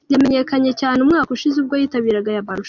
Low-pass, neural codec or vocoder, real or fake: 7.2 kHz; none; real